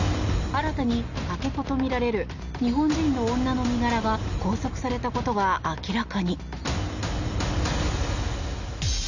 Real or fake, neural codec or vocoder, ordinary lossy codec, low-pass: real; none; none; 7.2 kHz